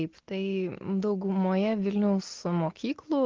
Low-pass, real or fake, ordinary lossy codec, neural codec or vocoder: 7.2 kHz; real; Opus, 16 kbps; none